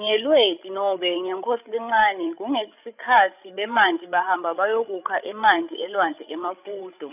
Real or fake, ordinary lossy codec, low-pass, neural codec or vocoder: fake; none; 3.6 kHz; codec, 16 kHz, 16 kbps, FreqCodec, larger model